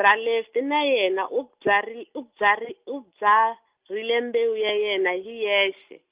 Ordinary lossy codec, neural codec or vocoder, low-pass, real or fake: Opus, 24 kbps; none; 3.6 kHz; real